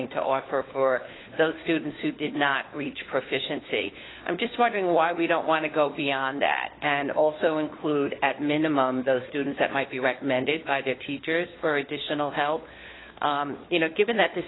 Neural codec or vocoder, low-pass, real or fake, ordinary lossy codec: codec, 16 kHz, 4 kbps, FunCodec, trained on LibriTTS, 50 frames a second; 7.2 kHz; fake; AAC, 16 kbps